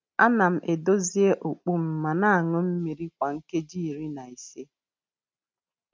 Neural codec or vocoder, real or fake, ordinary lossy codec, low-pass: none; real; none; none